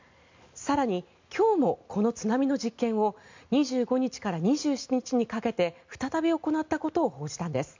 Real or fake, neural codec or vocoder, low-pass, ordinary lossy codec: real; none; 7.2 kHz; MP3, 64 kbps